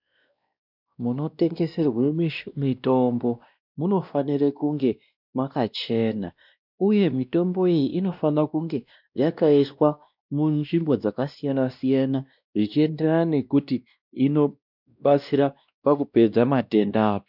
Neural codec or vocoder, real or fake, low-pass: codec, 16 kHz, 1 kbps, X-Codec, WavLM features, trained on Multilingual LibriSpeech; fake; 5.4 kHz